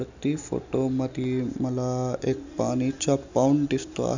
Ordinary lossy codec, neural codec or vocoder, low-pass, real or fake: none; none; 7.2 kHz; real